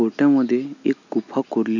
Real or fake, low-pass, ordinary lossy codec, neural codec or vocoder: real; 7.2 kHz; none; none